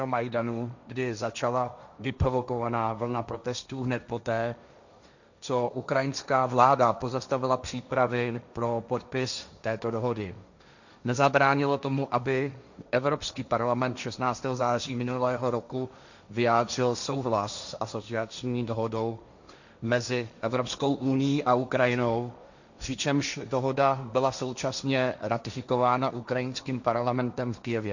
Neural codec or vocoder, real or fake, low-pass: codec, 16 kHz, 1.1 kbps, Voila-Tokenizer; fake; 7.2 kHz